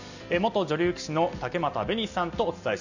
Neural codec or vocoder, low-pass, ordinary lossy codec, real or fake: none; 7.2 kHz; none; real